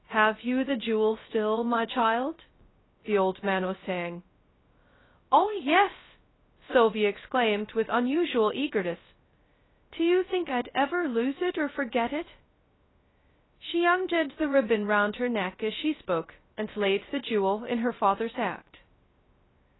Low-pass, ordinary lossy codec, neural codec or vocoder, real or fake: 7.2 kHz; AAC, 16 kbps; codec, 16 kHz, 0.2 kbps, FocalCodec; fake